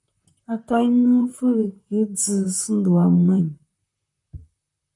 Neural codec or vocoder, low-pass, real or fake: vocoder, 44.1 kHz, 128 mel bands, Pupu-Vocoder; 10.8 kHz; fake